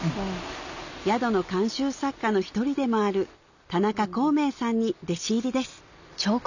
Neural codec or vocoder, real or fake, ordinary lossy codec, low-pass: none; real; none; 7.2 kHz